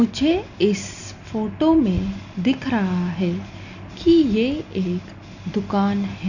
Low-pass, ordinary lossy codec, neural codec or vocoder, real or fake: 7.2 kHz; none; none; real